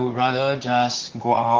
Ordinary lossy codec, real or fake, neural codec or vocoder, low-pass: Opus, 16 kbps; fake; vocoder, 44.1 kHz, 128 mel bands, Pupu-Vocoder; 7.2 kHz